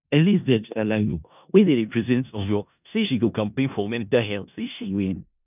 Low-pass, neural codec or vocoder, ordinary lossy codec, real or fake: 3.6 kHz; codec, 16 kHz in and 24 kHz out, 0.4 kbps, LongCat-Audio-Codec, four codebook decoder; none; fake